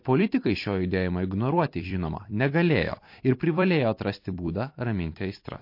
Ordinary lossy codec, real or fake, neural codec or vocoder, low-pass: MP3, 32 kbps; fake; vocoder, 44.1 kHz, 128 mel bands every 256 samples, BigVGAN v2; 5.4 kHz